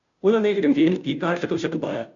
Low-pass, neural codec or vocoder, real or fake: 7.2 kHz; codec, 16 kHz, 0.5 kbps, FunCodec, trained on Chinese and English, 25 frames a second; fake